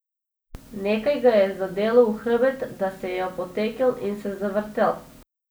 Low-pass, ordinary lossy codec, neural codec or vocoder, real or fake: none; none; none; real